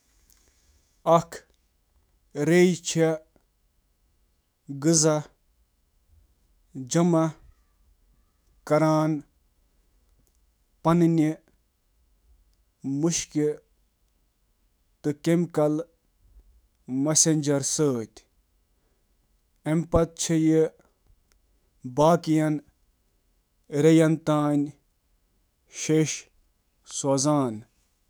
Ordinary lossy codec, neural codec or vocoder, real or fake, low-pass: none; autoencoder, 48 kHz, 128 numbers a frame, DAC-VAE, trained on Japanese speech; fake; none